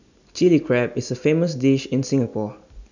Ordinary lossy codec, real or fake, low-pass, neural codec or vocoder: none; real; 7.2 kHz; none